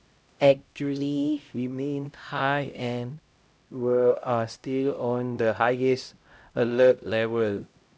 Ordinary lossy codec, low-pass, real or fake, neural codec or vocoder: none; none; fake; codec, 16 kHz, 0.5 kbps, X-Codec, HuBERT features, trained on LibriSpeech